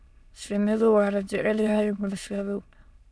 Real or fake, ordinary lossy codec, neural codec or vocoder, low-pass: fake; none; autoencoder, 22.05 kHz, a latent of 192 numbers a frame, VITS, trained on many speakers; none